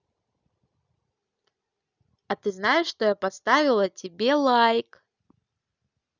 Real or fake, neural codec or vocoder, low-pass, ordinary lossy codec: real; none; 7.2 kHz; none